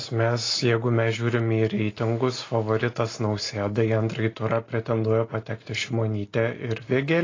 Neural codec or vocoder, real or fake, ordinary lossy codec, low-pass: none; real; AAC, 32 kbps; 7.2 kHz